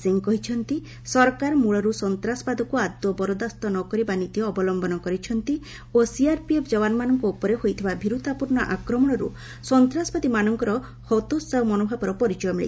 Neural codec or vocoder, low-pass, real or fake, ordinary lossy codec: none; none; real; none